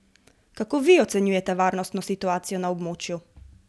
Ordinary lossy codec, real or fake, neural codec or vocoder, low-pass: none; real; none; none